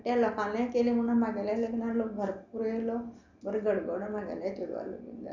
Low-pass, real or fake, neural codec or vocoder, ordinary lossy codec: 7.2 kHz; real; none; none